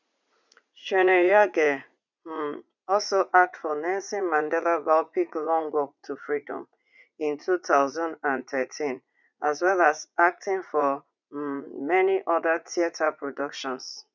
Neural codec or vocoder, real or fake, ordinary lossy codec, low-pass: vocoder, 44.1 kHz, 80 mel bands, Vocos; fake; none; 7.2 kHz